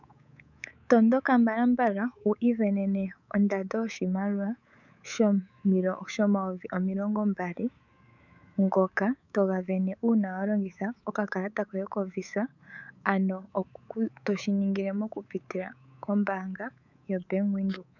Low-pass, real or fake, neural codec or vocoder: 7.2 kHz; fake; codec, 24 kHz, 3.1 kbps, DualCodec